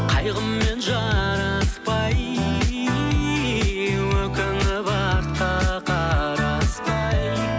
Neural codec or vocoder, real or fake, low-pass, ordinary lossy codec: none; real; none; none